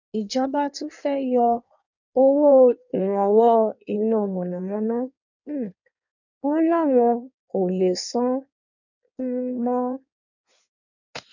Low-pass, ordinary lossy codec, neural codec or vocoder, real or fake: 7.2 kHz; none; codec, 16 kHz in and 24 kHz out, 1.1 kbps, FireRedTTS-2 codec; fake